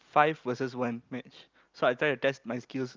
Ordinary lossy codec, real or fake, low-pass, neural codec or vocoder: Opus, 24 kbps; real; 7.2 kHz; none